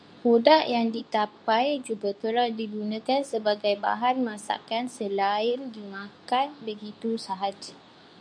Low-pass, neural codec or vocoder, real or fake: 9.9 kHz; codec, 24 kHz, 0.9 kbps, WavTokenizer, medium speech release version 2; fake